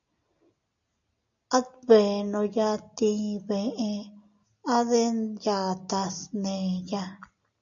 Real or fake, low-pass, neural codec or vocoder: real; 7.2 kHz; none